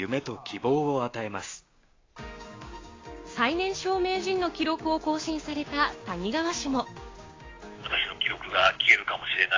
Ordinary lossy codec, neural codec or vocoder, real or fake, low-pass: AAC, 32 kbps; codec, 16 kHz, 6 kbps, DAC; fake; 7.2 kHz